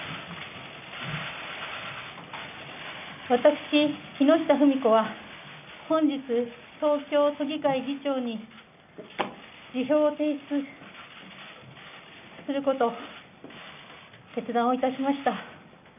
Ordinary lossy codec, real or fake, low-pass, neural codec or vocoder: none; real; 3.6 kHz; none